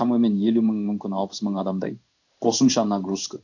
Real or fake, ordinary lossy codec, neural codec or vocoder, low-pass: fake; none; codec, 16 kHz in and 24 kHz out, 1 kbps, XY-Tokenizer; 7.2 kHz